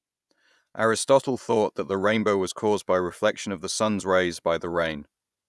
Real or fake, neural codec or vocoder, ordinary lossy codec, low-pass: fake; vocoder, 24 kHz, 100 mel bands, Vocos; none; none